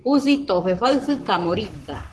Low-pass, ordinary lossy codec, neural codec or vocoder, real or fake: 10.8 kHz; Opus, 16 kbps; codec, 44.1 kHz, 7.8 kbps, Pupu-Codec; fake